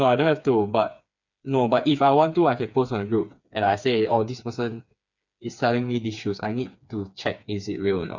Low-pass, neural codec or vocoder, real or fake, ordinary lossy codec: 7.2 kHz; codec, 16 kHz, 4 kbps, FreqCodec, smaller model; fake; none